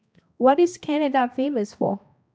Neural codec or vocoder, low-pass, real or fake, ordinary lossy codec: codec, 16 kHz, 1 kbps, X-Codec, HuBERT features, trained on balanced general audio; none; fake; none